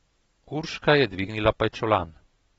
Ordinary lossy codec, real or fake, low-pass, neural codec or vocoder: AAC, 24 kbps; real; 19.8 kHz; none